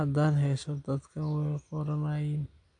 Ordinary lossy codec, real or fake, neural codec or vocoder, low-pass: AAC, 64 kbps; real; none; 9.9 kHz